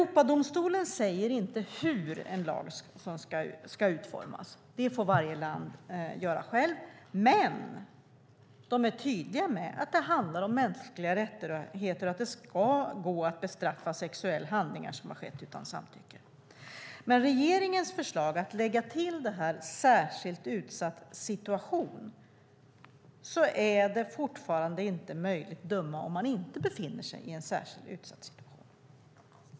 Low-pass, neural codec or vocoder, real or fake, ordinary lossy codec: none; none; real; none